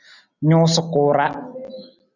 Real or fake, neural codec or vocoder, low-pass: real; none; 7.2 kHz